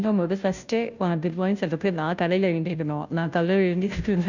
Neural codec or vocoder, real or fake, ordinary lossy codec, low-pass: codec, 16 kHz, 0.5 kbps, FunCodec, trained on Chinese and English, 25 frames a second; fake; none; 7.2 kHz